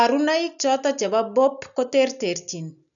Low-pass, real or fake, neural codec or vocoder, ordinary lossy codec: 7.2 kHz; real; none; none